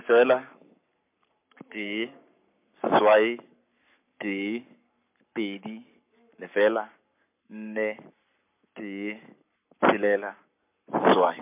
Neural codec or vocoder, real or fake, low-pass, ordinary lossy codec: codec, 44.1 kHz, 7.8 kbps, Pupu-Codec; fake; 3.6 kHz; MP3, 32 kbps